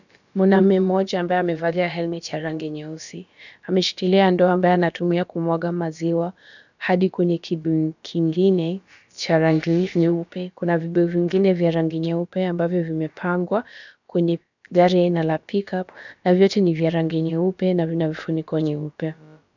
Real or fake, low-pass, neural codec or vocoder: fake; 7.2 kHz; codec, 16 kHz, about 1 kbps, DyCAST, with the encoder's durations